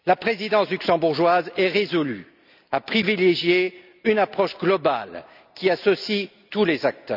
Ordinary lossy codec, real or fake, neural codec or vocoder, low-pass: none; real; none; 5.4 kHz